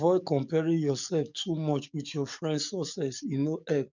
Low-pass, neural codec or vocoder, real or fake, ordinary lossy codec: 7.2 kHz; codec, 44.1 kHz, 7.8 kbps, DAC; fake; none